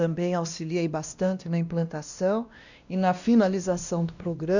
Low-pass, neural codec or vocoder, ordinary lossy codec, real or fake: 7.2 kHz; codec, 16 kHz, 1 kbps, X-Codec, WavLM features, trained on Multilingual LibriSpeech; none; fake